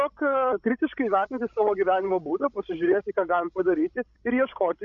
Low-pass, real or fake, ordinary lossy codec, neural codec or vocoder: 7.2 kHz; fake; MP3, 64 kbps; codec, 16 kHz, 16 kbps, FreqCodec, larger model